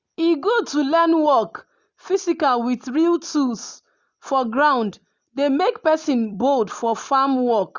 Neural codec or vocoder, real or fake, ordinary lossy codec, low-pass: none; real; Opus, 64 kbps; 7.2 kHz